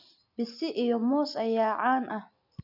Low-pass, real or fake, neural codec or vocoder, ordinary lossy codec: 5.4 kHz; real; none; none